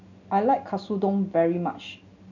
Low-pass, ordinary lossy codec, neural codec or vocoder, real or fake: 7.2 kHz; none; none; real